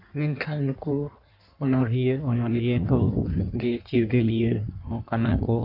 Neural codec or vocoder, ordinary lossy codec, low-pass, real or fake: codec, 16 kHz in and 24 kHz out, 1.1 kbps, FireRedTTS-2 codec; none; 5.4 kHz; fake